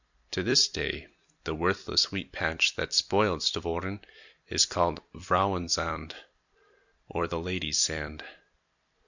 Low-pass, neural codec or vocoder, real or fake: 7.2 kHz; vocoder, 44.1 kHz, 128 mel bands every 256 samples, BigVGAN v2; fake